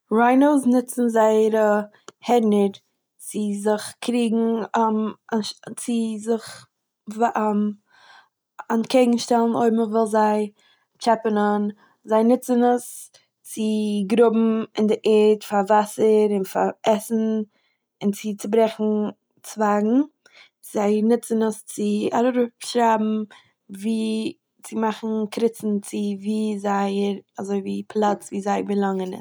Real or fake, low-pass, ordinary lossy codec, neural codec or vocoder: real; none; none; none